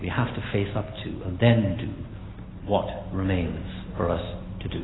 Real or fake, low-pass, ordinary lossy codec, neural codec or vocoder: real; 7.2 kHz; AAC, 16 kbps; none